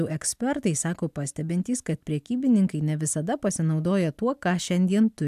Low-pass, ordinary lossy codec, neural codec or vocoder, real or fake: 14.4 kHz; AAC, 96 kbps; none; real